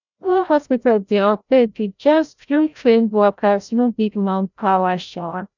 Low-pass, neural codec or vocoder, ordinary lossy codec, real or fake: 7.2 kHz; codec, 16 kHz, 0.5 kbps, FreqCodec, larger model; none; fake